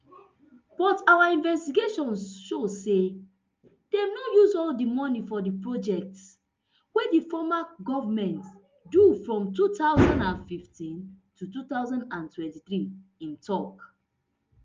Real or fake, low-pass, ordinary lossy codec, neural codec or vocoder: real; 7.2 kHz; Opus, 24 kbps; none